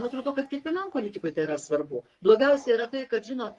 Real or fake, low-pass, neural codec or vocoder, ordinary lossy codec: fake; 10.8 kHz; codec, 44.1 kHz, 3.4 kbps, Pupu-Codec; Opus, 32 kbps